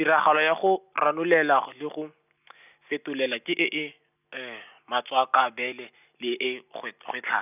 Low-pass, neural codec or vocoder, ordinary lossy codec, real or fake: 3.6 kHz; none; none; real